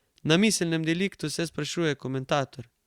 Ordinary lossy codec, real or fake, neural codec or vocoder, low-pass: Opus, 64 kbps; real; none; 19.8 kHz